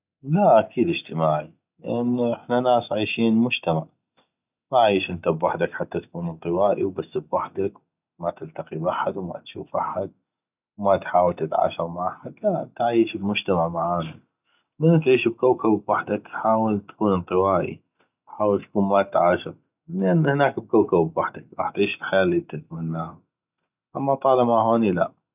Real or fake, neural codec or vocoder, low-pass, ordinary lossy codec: real; none; 3.6 kHz; none